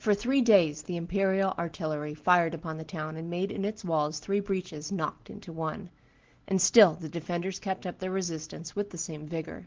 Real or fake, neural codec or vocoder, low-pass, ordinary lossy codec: real; none; 7.2 kHz; Opus, 16 kbps